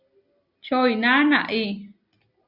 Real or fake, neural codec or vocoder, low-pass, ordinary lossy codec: real; none; 5.4 kHz; Opus, 64 kbps